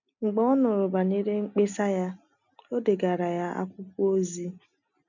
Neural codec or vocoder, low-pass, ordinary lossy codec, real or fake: none; 7.2 kHz; none; real